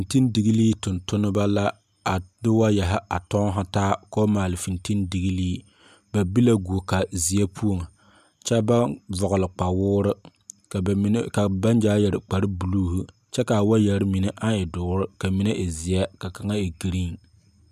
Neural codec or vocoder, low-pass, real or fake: none; 14.4 kHz; real